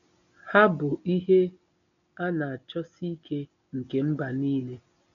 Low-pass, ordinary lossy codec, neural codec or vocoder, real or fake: 7.2 kHz; none; none; real